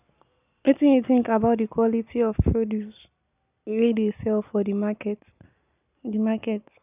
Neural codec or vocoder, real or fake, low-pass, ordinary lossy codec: none; real; 3.6 kHz; none